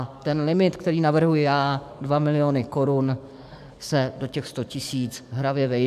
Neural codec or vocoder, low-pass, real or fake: codec, 44.1 kHz, 7.8 kbps, DAC; 14.4 kHz; fake